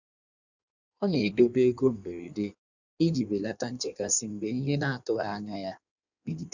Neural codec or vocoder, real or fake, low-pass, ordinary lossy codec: codec, 16 kHz in and 24 kHz out, 1.1 kbps, FireRedTTS-2 codec; fake; 7.2 kHz; none